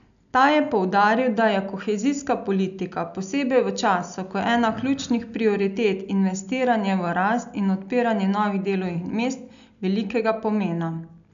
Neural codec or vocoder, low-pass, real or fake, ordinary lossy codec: none; 7.2 kHz; real; none